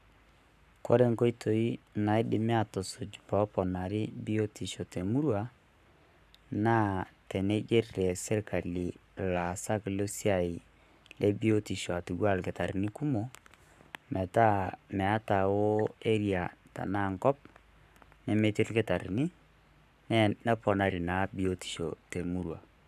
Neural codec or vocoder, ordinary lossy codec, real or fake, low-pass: codec, 44.1 kHz, 7.8 kbps, Pupu-Codec; none; fake; 14.4 kHz